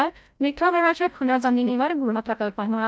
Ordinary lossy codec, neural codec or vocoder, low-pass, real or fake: none; codec, 16 kHz, 0.5 kbps, FreqCodec, larger model; none; fake